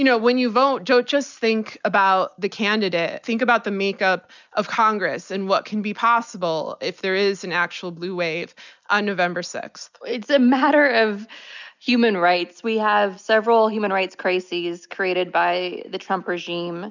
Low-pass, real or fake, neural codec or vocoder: 7.2 kHz; real; none